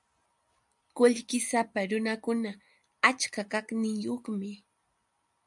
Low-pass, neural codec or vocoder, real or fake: 10.8 kHz; none; real